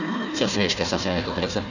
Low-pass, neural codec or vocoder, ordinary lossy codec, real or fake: 7.2 kHz; codec, 16 kHz, 1 kbps, FunCodec, trained on Chinese and English, 50 frames a second; none; fake